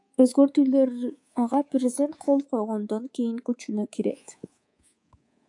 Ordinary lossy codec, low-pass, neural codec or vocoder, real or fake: AAC, 64 kbps; 10.8 kHz; codec, 24 kHz, 3.1 kbps, DualCodec; fake